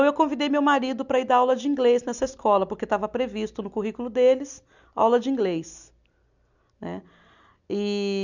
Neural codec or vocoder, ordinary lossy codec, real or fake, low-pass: none; none; real; 7.2 kHz